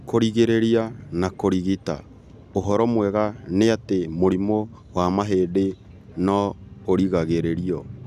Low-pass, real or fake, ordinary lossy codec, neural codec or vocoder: 14.4 kHz; real; none; none